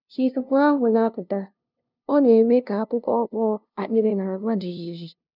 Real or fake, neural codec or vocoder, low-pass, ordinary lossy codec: fake; codec, 16 kHz, 0.5 kbps, FunCodec, trained on LibriTTS, 25 frames a second; 5.4 kHz; none